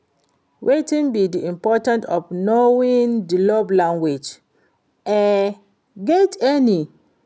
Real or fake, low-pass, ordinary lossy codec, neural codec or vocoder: real; none; none; none